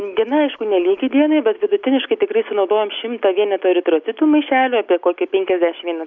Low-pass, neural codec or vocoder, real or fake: 7.2 kHz; none; real